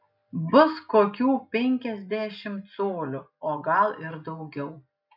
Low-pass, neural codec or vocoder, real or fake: 5.4 kHz; none; real